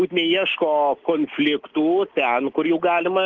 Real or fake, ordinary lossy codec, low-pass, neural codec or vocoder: real; Opus, 16 kbps; 7.2 kHz; none